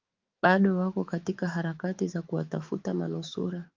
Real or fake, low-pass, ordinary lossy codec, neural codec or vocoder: fake; 7.2 kHz; Opus, 24 kbps; autoencoder, 48 kHz, 128 numbers a frame, DAC-VAE, trained on Japanese speech